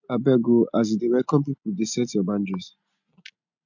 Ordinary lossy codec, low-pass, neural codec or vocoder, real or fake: MP3, 64 kbps; 7.2 kHz; none; real